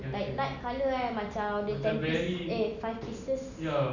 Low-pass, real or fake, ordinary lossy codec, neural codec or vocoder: 7.2 kHz; real; none; none